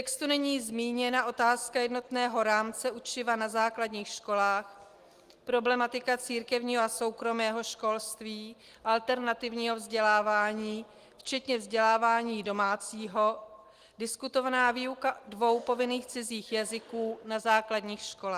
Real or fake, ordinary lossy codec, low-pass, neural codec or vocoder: real; Opus, 24 kbps; 14.4 kHz; none